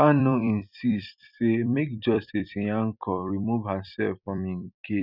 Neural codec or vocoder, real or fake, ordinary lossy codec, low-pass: vocoder, 44.1 kHz, 128 mel bands every 256 samples, BigVGAN v2; fake; none; 5.4 kHz